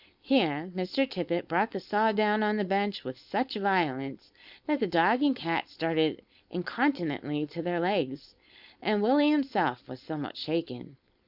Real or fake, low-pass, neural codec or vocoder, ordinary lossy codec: fake; 5.4 kHz; codec, 16 kHz, 4.8 kbps, FACodec; Opus, 64 kbps